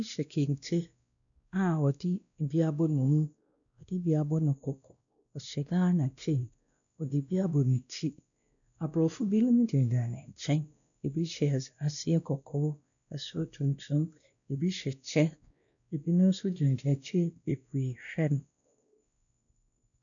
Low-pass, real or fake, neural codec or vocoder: 7.2 kHz; fake; codec, 16 kHz, 1 kbps, X-Codec, WavLM features, trained on Multilingual LibriSpeech